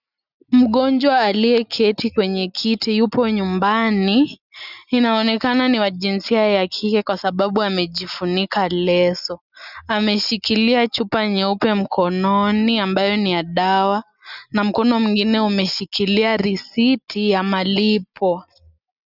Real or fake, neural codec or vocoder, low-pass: real; none; 5.4 kHz